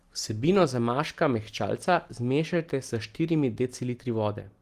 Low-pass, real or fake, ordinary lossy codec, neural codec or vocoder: 14.4 kHz; real; Opus, 24 kbps; none